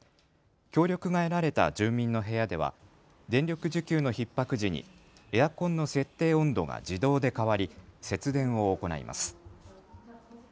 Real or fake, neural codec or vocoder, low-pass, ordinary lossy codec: real; none; none; none